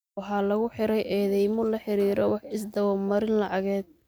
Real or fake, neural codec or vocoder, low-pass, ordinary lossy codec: real; none; none; none